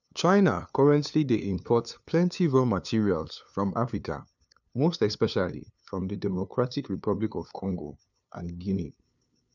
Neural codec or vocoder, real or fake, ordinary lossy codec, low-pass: codec, 16 kHz, 2 kbps, FunCodec, trained on LibriTTS, 25 frames a second; fake; none; 7.2 kHz